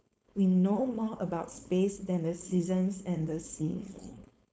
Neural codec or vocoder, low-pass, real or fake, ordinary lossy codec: codec, 16 kHz, 4.8 kbps, FACodec; none; fake; none